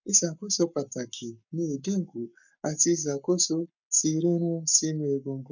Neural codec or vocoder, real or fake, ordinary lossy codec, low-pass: codec, 44.1 kHz, 7.8 kbps, Pupu-Codec; fake; none; 7.2 kHz